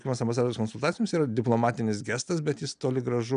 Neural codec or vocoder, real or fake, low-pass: none; real; 9.9 kHz